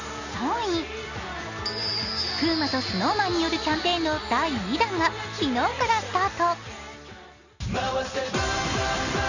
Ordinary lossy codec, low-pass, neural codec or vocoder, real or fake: none; 7.2 kHz; none; real